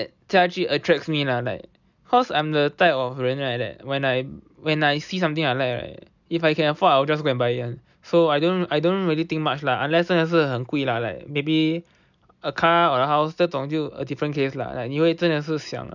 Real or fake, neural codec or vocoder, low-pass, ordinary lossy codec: real; none; 7.2 kHz; none